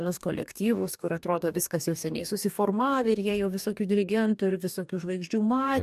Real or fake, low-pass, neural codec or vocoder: fake; 14.4 kHz; codec, 44.1 kHz, 2.6 kbps, DAC